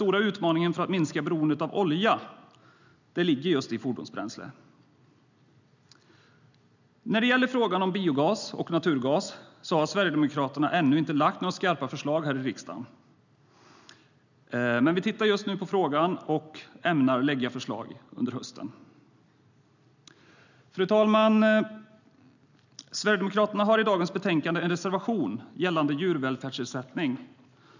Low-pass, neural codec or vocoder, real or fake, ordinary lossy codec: 7.2 kHz; none; real; none